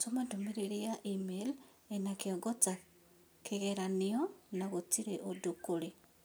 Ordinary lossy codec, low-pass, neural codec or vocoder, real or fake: none; none; none; real